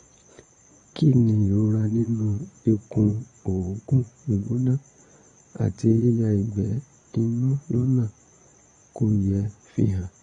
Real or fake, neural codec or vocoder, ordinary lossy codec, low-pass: fake; vocoder, 22.05 kHz, 80 mel bands, Vocos; AAC, 32 kbps; 9.9 kHz